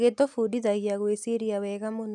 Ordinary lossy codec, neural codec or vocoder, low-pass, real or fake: none; none; none; real